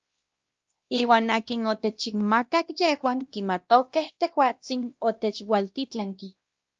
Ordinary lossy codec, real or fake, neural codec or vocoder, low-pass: Opus, 24 kbps; fake; codec, 16 kHz, 1 kbps, X-Codec, WavLM features, trained on Multilingual LibriSpeech; 7.2 kHz